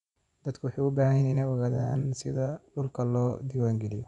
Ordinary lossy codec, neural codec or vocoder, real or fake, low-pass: none; vocoder, 24 kHz, 100 mel bands, Vocos; fake; 10.8 kHz